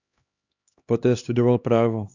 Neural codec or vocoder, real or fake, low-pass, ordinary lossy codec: codec, 16 kHz, 2 kbps, X-Codec, HuBERT features, trained on LibriSpeech; fake; 7.2 kHz; Opus, 64 kbps